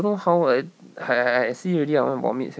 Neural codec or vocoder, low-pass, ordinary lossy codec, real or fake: none; none; none; real